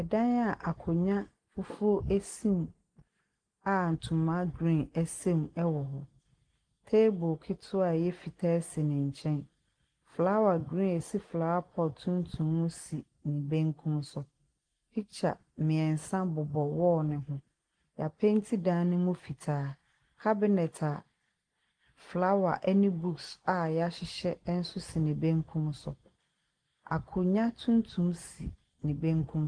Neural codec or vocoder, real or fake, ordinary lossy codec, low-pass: none; real; Opus, 24 kbps; 9.9 kHz